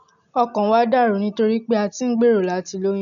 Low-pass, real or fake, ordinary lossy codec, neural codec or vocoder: 7.2 kHz; real; none; none